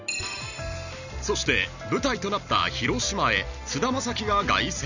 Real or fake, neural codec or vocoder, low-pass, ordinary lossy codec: real; none; 7.2 kHz; none